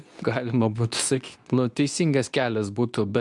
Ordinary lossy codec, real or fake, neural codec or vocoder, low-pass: MP3, 96 kbps; fake; codec, 24 kHz, 0.9 kbps, WavTokenizer, medium speech release version 2; 10.8 kHz